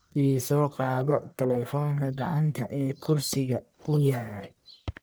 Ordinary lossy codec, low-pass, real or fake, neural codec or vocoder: none; none; fake; codec, 44.1 kHz, 1.7 kbps, Pupu-Codec